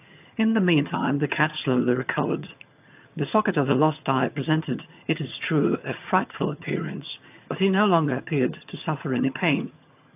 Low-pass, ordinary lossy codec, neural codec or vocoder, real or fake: 3.6 kHz; AAC, 32 kbps; vocoder, 22.05 kHz, 80 mel bands, HiFi-GAN; fake